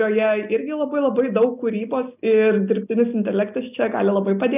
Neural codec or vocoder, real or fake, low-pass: none; real; 3.6 kHz